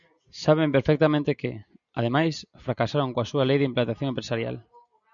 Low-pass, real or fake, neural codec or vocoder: 7.2 kHz; real; none